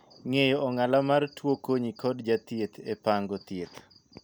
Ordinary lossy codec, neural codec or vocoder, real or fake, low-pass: none; none; real; none